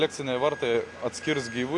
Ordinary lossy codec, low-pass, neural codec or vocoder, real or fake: AAC, 48 kbps; 10.8 kHz; none; real